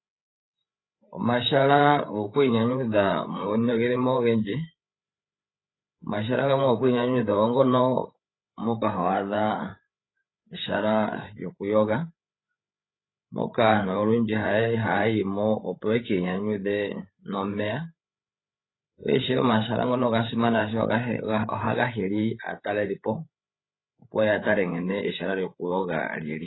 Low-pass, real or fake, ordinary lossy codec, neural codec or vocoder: 7.2 kHz; fake; AAC, 16 kbps; codec, 16 kHz, 8 kbps, FreqCodec, larger model